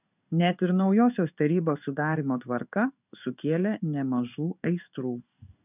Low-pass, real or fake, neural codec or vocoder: 3.6 kHz; fake; codec, 16 kHz in and 24 kHz out, 1 kbps, XY-Tokenizer